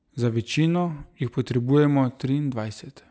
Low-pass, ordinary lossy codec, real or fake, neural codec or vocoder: none; none; real; none